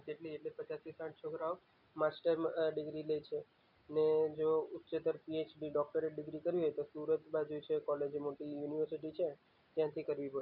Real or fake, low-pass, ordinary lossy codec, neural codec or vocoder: real; 5.4 kHz; none; none